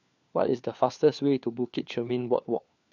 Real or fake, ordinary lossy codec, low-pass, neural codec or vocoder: fake; none; 7.2 kHz; codec, 16 kHz, 4 kbps, FunCodec, trained on LibriTTS, 50 frames a second